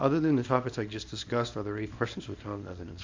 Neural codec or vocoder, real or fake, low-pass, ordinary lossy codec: codec, 24 kHz, 0.9 kbps, WavTokenizer, small release; fake; 7.2 kHz; AAC, 48 kbps